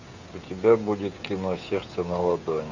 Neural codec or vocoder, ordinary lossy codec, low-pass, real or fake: codec, 16 kHz, 16 kbps, FreqCodec, smaller model; Opus, 64 kbps; 7.2 kHz; fake